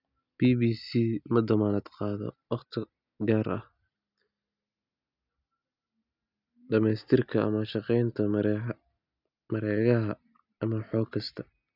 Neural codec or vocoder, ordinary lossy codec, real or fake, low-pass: none; none; real; 5.4 kHz